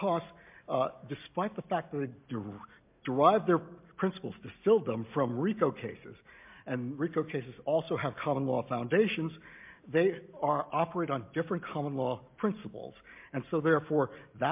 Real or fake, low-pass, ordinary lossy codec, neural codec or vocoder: real; 3.6 kHz; MP3, 32 kbps; none